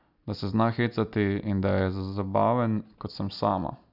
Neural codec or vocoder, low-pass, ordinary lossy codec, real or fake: none; 5.4 kHz; none; real